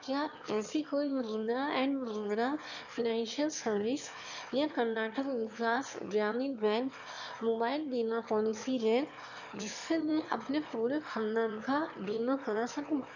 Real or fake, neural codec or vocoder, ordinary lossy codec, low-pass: fake; autoencoder, 22.05 kHz, a latent of 192 numbers a frame, VITS, trained on one speaker; none; 7.2 kHz